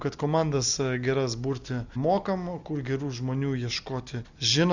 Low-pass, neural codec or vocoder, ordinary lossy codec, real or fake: 7.2 kHz; none; Opus, 64 kbps; real